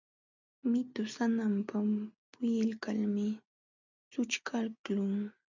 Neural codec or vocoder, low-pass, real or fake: none; 7.2 kHz; real